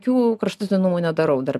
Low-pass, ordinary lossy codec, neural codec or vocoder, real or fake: 14.4 kHz; MP3, 96 kbps; none; real